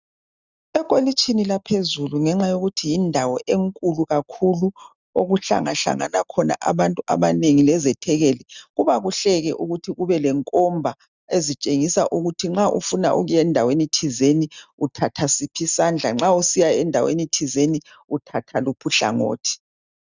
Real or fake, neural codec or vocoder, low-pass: real; none; 7.2 kHz